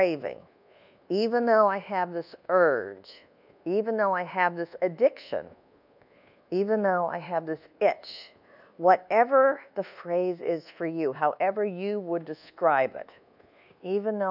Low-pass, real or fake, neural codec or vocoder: 5.4 kHz; fake; codec, 24 kHz, 1.2 kbps, DualCodec